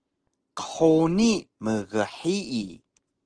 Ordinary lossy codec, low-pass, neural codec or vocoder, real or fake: Opus, 16 kbps; 9.9 kHz; none; real